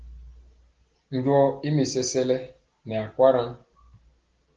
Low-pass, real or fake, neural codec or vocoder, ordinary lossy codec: 7.2 kHz; real; none; Opus, 16 kbps